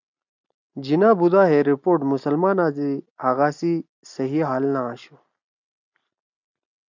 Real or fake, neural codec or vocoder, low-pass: real; none; 7.2 kHz